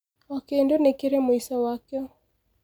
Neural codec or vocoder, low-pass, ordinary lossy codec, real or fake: none; none; none; real